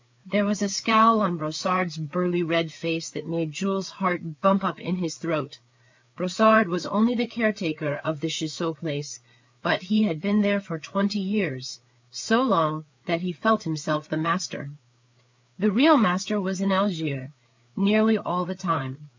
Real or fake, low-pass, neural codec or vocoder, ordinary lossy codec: fake; 7.2 kHz; codec, 16 kHz, 4 kbps, FreqCodec, larger model; MP3, 64 kbps